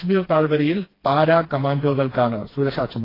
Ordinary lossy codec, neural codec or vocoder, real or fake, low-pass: AAC, 24 kbps; codec, 16 kHz, 2 kbps, FreqCodec, smaller model; fake; 5.4 kHz